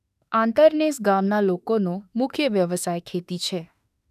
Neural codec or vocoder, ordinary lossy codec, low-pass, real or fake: autoencoder, 48 kHz, 32 numbers a frame, DAC-VAE, trained on Japanese speech; none; 14.4 kHz; fake